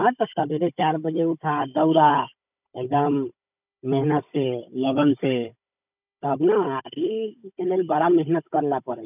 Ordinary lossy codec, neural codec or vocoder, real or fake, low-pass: none; codec, 16 kHz, 16 kbps, FunCodec, trained on Chinese and English, 50 frames a second; fake; 3.6 kHz